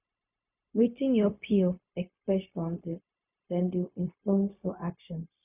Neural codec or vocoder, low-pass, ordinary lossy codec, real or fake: codec, 16 kHz, 0.4 kbps, LongCat-Audio-Codec; 3.6 kHz; none; fake